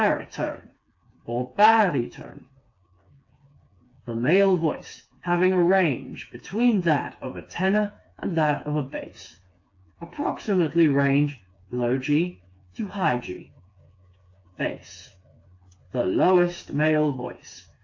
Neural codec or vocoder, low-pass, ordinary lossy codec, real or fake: codec, 16 kHz, 4 kbps, FreqCodec, smaller model; 7.2 kHz; AAC, 48 kbps; fake